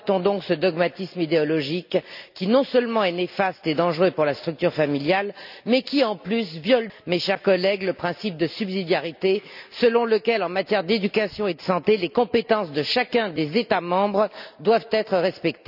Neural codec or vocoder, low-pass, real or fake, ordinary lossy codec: none; 5.4 kHz; real; none